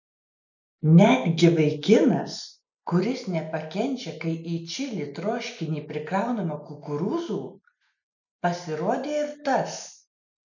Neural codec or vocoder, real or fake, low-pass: none; real; 7.2 kHz